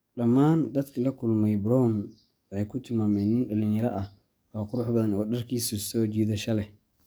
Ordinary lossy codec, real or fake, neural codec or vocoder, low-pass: none; fake; codec, 44.1 kHz, 7.8 kbps, DAC; none